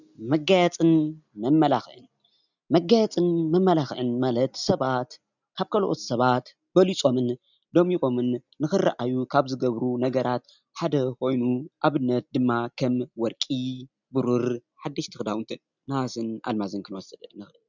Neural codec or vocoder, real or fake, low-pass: none; real; 7.2 kHz